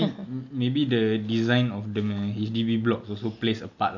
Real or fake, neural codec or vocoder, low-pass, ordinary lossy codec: real; none; 7.2 kHz; none